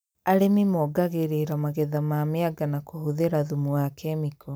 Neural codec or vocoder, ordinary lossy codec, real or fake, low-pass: none; none; real; none